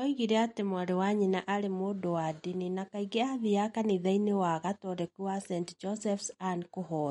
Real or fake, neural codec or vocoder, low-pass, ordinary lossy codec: real; none; 19.8 kHz; MP3, 48 kbps